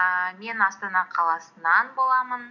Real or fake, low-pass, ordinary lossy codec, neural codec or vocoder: real; 7.2 kHz; none; none